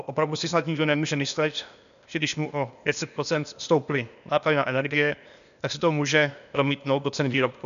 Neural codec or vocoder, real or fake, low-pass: codec, 16 kHz, 0.8 kbps, ZipCodec; fake; 7.2 kHz